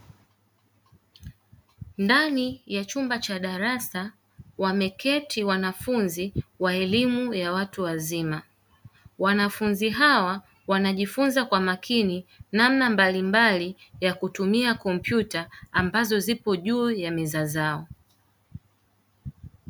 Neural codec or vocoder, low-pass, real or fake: none; 19.8 kHz; real